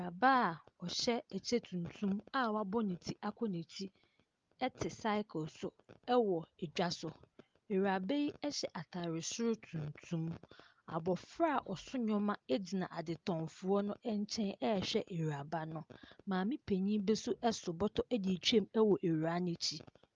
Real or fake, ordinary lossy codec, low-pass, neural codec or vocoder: real; Opus, 32 kbps; 7.2 kHz; none